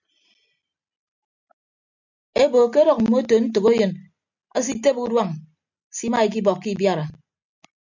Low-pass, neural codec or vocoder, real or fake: 7.2 kHz; none; real